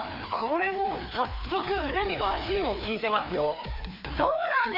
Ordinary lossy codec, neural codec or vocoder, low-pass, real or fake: none; codec, 16 kHz, 2 kbps, FreqCodec, larger model; 5.4 kHz; fake